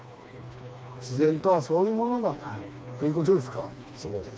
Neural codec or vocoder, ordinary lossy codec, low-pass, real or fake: codec, 16 kHz, 2 kbps, FreqCodec, smaller model; none; none; fake